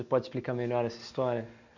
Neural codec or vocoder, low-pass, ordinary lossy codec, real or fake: none; 7.2 kHz; none; real